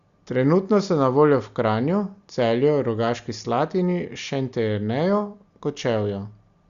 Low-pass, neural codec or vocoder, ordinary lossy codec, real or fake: 7.2 kHz; none; Opus, 64 kbps; real